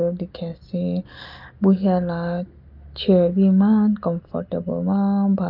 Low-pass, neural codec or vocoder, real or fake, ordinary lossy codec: 5.4 kHz; none; real; Opus, 24 kbps